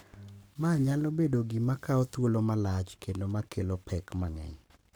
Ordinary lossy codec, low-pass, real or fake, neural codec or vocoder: none; none; fake; codec, 44.1 kHz, 7.8 kbps, Pupu-Codec